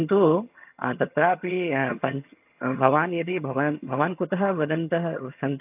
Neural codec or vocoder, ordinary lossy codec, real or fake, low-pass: vocoder, 22.05 kHz, 80 mel bands, HiFi-GAN; AAC, 32 kbps; fake; 3.6 kHz